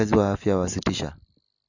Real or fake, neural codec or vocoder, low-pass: real; none; 7.2 kHz